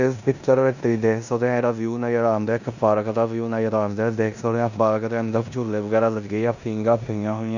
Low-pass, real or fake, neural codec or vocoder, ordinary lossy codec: 7.2 kHz; fake; codec, 16 kHz in and 24 kHz out, 0.9 kbps, LongCat-Audio-Codec, four codebook decoder; none